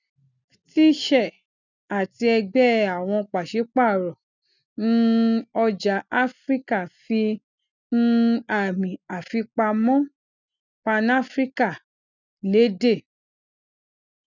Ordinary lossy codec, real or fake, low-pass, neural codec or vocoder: none; real; 7.2 kHz; none